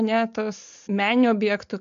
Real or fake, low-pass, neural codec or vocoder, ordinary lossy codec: real; 7.2 kHz; none; MP3, 64 kbps